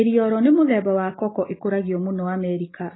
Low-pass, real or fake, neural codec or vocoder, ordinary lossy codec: 7.2 kHz; real; none; AAC, 16 kbps